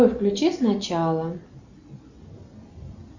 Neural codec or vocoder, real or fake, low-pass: none; real; 7.2 kHz